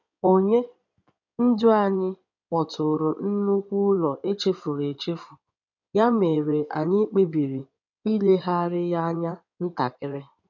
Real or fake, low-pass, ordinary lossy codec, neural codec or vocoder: fake; 7.2 kHz; none; codec, 16 kHz in and 24 kHz out, 2.2 kbps, FireRedTTS-2 codec